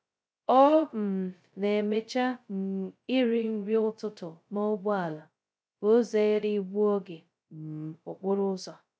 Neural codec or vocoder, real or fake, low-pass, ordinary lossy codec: codec, 16 kHz, 0.2 kbps, FocalCodec; fake; none; none